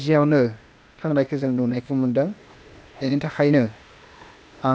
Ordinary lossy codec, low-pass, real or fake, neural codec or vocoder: none; none; fake; codec, 16 kHz, 0.8 kbps, ZipCodec